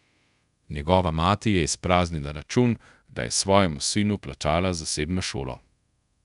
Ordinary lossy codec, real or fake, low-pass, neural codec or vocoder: none; fake; 10.8 kHz; codec, 24 kHz, 0.5 kbps, DualCodec